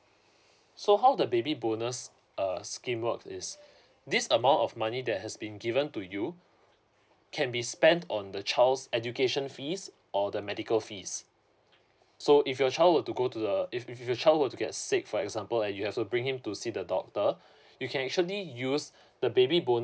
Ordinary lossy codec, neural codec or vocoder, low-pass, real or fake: none; none; none; real